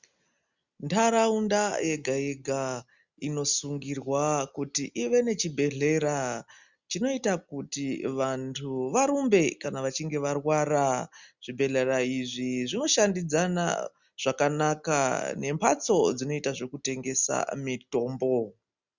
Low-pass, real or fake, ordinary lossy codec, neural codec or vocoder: 7.2 kHz; real; Opus, 64 kbps; none